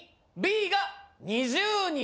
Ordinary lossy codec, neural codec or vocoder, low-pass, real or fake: none; none; none; real